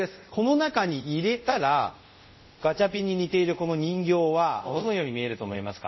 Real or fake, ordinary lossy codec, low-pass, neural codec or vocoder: fake; MP3, 24 kbps; 7.2 kHz; codec, 24 kHz, 0.5 kbps, DualCodec